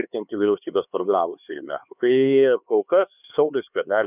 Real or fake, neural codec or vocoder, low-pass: fake; codec, 16 kHz, 2 kbps, X-Codec, HuBERT features, trained on LibriSpeech; 3.6 kHz